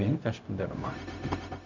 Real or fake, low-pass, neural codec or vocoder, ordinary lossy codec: fake; 7.2 kHz; codec, 16 kHz, 0.4 kbps, LongCat-Audio-Codec; none